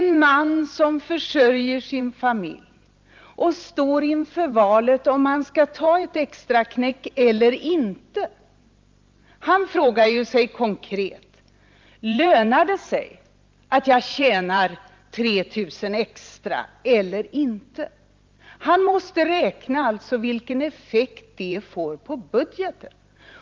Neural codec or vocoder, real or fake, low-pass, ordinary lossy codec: vocoder, 44.1 kHz, 128 mel bands every 512 samples, BigVGAN v2; fake; 7.2 kHz; Opus, 24 kbps